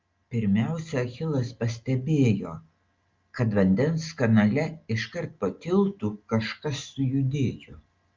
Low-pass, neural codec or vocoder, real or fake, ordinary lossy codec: 7.2 kHz; none; real; Opus, 24 kbps